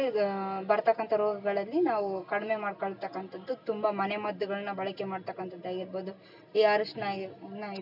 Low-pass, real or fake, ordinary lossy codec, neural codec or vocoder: 5.4 kHz; real; none; none